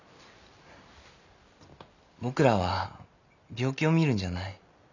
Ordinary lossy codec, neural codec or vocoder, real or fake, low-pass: none; none; real; 7.2 kHz